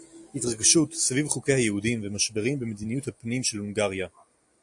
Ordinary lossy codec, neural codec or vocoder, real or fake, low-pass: AAC, 64 kbps; none; real; 10.8 kHz